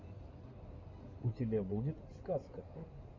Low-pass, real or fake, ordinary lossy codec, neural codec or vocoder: 7.2 kHz; fake; MP3, 48 kbps; codec, 16 kHz in and 24 kHz out, 2.2 kbps, FireRedTTS-2 codec